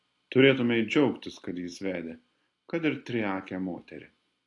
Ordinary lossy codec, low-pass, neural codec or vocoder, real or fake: AAC, 48 kbps; 10.8 kHz; none; real